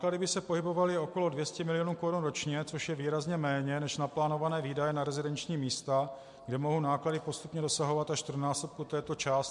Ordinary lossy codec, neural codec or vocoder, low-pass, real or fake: MP3, 64 kbps; none; 10.8 kHz; real